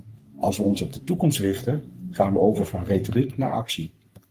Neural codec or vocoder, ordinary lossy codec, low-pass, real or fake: codec, 44.1 kHz, 3.4 kbps, Pupu-Codec; Opus, 32 kbps; 14.4 kHz; fake